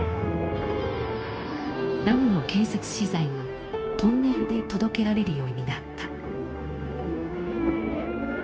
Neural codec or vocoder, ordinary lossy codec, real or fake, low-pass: codec, 16 kHz, 0.9 kbps, LongCat-Audio-Codec; none; fake; none